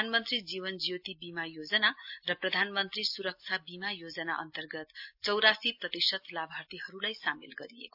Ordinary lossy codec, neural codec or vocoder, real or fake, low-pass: AAC, 48 kbps; none; real; 5.4 kHz